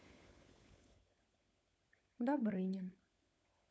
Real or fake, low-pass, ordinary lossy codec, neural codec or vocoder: fake; none; none; codec, 16 kHz, 16 kbps, FunCodec, trained on LibriTTS, 50 frames a second